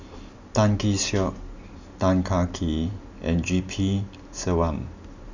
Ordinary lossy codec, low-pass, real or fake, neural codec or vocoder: none; 7.2 kHz; real; none